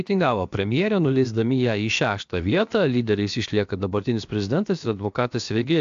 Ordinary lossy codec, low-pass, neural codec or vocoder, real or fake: AAC, 64 kbps; 7.2 kHz; codec, 16 kHz, about 1 kbps, DyCAST, with the encoder's durations; fake